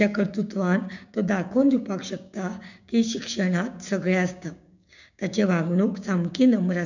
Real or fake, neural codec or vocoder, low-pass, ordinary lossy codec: fake; codec, 16 kHz, 6 kbps, DAC; 7.2 kHz; none